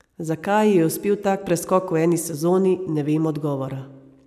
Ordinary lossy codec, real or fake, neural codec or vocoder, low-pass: none; real; none; 14.4 kHz